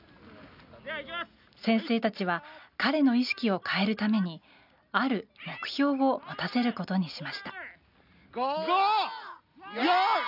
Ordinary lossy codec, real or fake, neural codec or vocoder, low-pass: none; real; none; 5.4 kHz